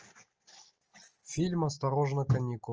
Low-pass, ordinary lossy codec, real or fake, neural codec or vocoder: 7.2 kHz; Opus, 24 kbps; real; none